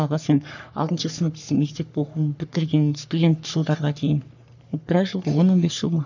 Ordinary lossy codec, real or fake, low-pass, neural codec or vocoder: none; fake; 7.2 kHz; codec, 44.1 kHz, 3.4 kbps, Pupu-Codec